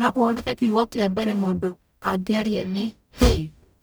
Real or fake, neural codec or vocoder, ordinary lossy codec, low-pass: fake; codec, 44.1 kHz, 0.9 kbps, DAC; none; none